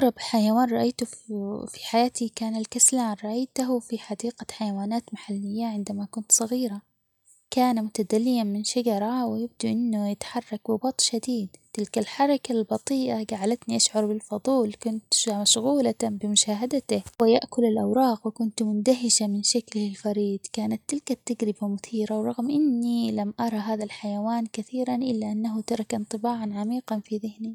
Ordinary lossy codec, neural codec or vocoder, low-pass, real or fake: none; none; 19.8 kHz; real